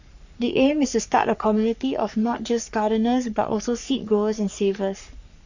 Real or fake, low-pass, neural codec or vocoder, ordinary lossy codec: fake; 7.2 kHz; codec, 44.1 kHz, 3.4 kbps, Pupu-Codec; none